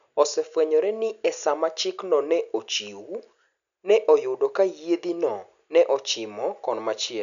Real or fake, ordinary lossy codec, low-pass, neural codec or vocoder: real; none; 7.2 kHz; none